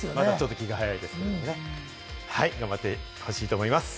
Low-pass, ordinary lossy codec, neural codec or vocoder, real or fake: none; none; none; real